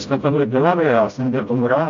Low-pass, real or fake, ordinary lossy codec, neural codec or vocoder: 7.2 kHz; fake; MP3, 48 kbps; codec, 16 kHz, 0.5 kbps, FreqCodec, smaller model